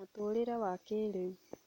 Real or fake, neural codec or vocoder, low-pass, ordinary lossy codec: real; none; 19.8 kHz; MP3, 64 kbps